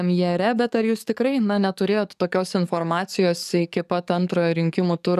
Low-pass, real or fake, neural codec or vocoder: 14.4 kHz; fake; codec, 44.1 kHz, 7.8 kbps, DAC